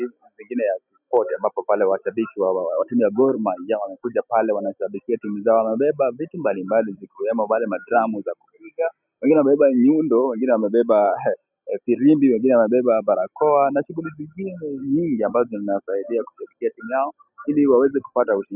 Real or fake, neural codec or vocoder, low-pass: real; none; 3.6 kHz